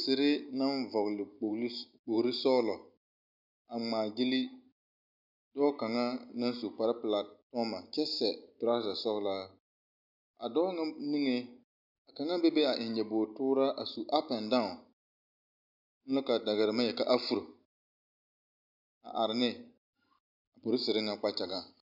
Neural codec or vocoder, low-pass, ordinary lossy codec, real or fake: none; 5.4 kHz; MP3, 48 kbps; real